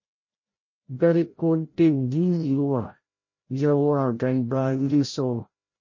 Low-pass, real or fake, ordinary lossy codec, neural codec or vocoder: 7.2 kHz; fake; MP3, 32 kbps; codec, 16 kHz, 0.5 kbps, FreqCodec, larger model